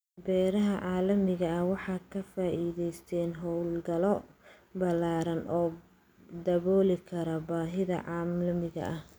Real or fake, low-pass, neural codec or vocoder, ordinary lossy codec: real; none; none; none